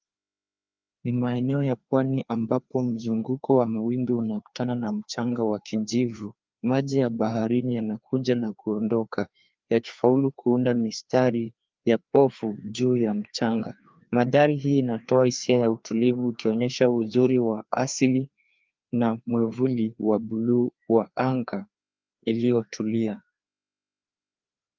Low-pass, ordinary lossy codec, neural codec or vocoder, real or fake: 7.2 kHz; Opus, 24 kbps; codec, 16 kHz, 2 kbps, FreqCodec, larger model; fake